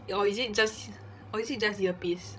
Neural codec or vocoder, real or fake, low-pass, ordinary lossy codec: codec, 16 kHz, 16 kbps, FreqCodec, larger model; fake; none; none